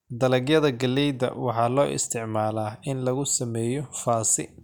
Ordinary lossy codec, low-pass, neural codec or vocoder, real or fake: none; 19.8 kHz; none; real